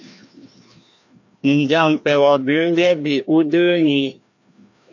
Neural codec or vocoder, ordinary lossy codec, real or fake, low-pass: codec, 16 kHz, 1 kbps, FreqCodec, larger model; AAC, 48 kbps; fake; 7.2 kHz